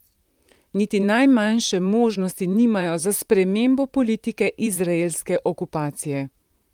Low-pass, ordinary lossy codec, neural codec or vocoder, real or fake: 19.8 kHz; Opus, 32 kbps; vocoder, 44.1 kHz, 128 mel bands, Pupu-Vocoder; fake